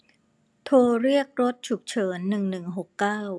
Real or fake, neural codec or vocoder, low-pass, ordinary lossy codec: real; none; none; none